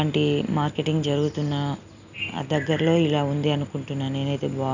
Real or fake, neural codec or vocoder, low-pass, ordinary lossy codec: real; none; 7.2 kHz; none